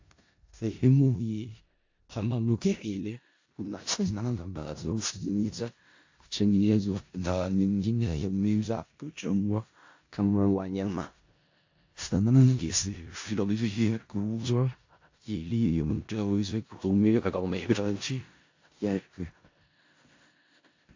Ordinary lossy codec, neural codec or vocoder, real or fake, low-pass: AAC, 48 kbps; codec, 16 kHz in and 24 kHz out, 0.4 kbps, LongCat-Audio-Codec, four codebook decoder; fake; 7.2 kHz